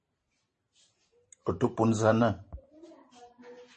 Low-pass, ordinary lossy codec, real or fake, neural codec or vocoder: 10.8 kHz; MP3, 32 kbps; fake; vocoder, 44.1 kHz, 128 mel bands every 512 samples, BigVGAN v2